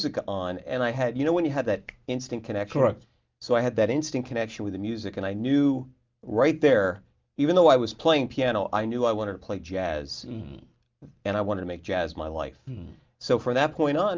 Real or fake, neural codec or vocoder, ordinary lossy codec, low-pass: real; none; Opus, 32 kbps; 7.2 kHz